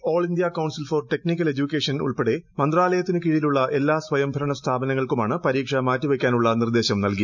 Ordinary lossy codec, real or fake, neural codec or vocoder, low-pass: none; real; none; 7.2 kHz